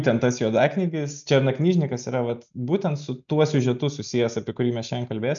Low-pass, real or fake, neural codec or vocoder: 7.2 kHz; real; none